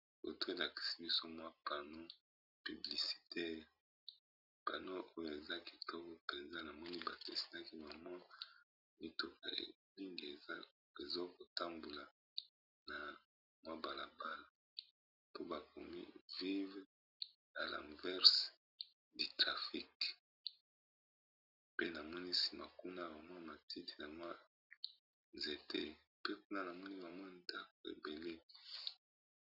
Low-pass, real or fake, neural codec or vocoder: 5.4 kHz; real; none